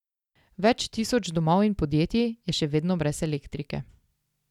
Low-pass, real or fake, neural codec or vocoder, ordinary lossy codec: 19.8 kHz; real; none; none